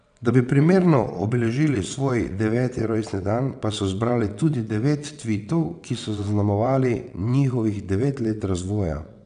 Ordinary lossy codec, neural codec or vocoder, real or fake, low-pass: none; vocoder, 22.05 kHz, 80 mel bands, WaveNeXt; fake; 9.9 kHz